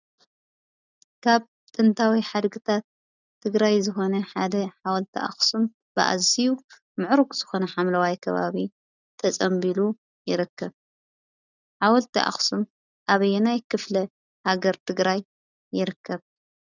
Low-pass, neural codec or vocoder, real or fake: 7.2 kHz; none; real